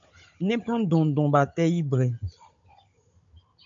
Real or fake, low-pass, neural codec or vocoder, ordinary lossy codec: fake; 7.2 kHz; codec, 16 kHz, 16 kbps, FunCodec, trained on Chinese and English, 50 frames a second; MP3, 48 kbps